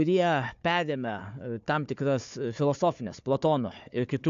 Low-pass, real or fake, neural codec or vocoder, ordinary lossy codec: 7.2 kHz; fake; codec, 16 kHz, 4 kbps, FunCodec, trained on Chinese and English, 50 frames a second; AAC, 64 kbps